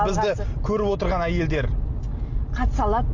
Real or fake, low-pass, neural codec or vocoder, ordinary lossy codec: real; 7.2 kHz; none; AAC, 48 kbps